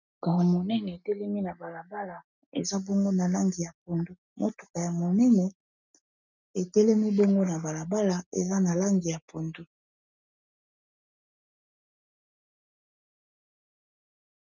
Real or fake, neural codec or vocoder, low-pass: real; none; 7.2 kHz